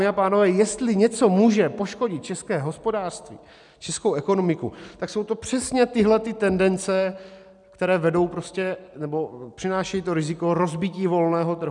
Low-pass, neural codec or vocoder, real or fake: 10.8 kHz; none; real